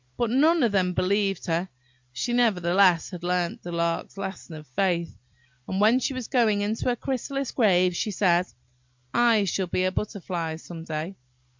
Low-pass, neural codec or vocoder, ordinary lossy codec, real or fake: 7.2 kHz; none; MP3, 64 kbps; real